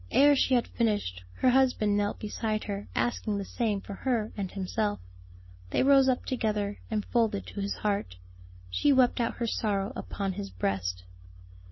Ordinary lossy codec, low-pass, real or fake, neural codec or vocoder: MP3, 24 kbps; 7.2 kHz; real; none